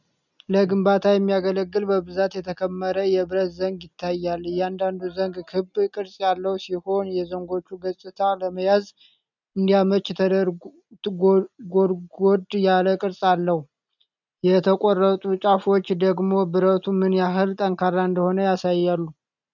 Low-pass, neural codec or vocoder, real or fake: 7.2 kHz; none; real